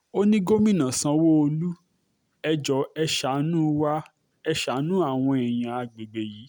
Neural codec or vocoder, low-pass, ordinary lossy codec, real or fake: none; none; none; real